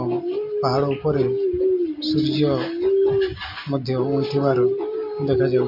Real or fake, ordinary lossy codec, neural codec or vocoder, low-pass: real; MP3, 32 kbps; none; 5.4 kHz